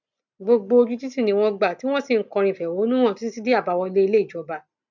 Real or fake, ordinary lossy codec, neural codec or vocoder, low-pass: real; none; none; 7.2 kHz